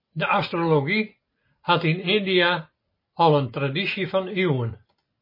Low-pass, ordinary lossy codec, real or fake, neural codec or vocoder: 5.4 kHz; MP3, 24 kbps; real; none